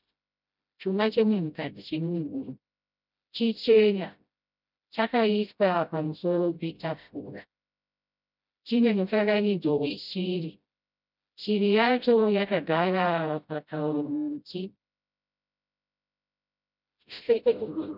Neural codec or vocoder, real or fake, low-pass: codec, 16 kHz, 0.5 kbps, FreqCodec, smaller model; fake; 5.4 kHz